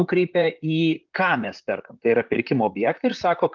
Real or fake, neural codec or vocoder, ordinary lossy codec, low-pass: fake; vocoder, 44.1 kHz, 128 mel bands, Pupu-Vocoder; Opus, 32 kbps; 7.2 kHz